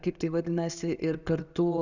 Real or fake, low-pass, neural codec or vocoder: real; 7.2 kHz; none